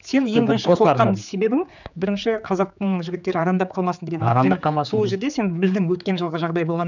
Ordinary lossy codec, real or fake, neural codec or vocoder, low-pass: none; fake; codec, 16 kHz, 4 kbps, X-Codec, HuBERT features, trained on general audio; 7.2 kHz